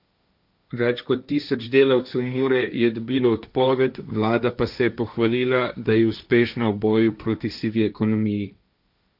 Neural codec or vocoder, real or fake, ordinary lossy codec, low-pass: codec, 16 kHz, 1.1 kbps, Voila-Tokenizer; fake; none; 5.4 kHz